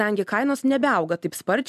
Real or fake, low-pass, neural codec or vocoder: real; 14.4 kHz; none